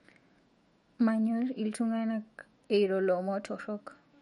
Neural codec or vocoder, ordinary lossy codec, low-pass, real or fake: autoencoder, 48 kHz, 128 numbers a frame, DAC-VAE, trained on Japanese speech; MP3, 48 kbps; 19.8 kHz; fake